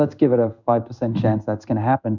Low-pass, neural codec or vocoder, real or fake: 7.2 kHz; codec, 16 kHz in and 24 kHz out, 1 kbps, XY-Tokenizer; fake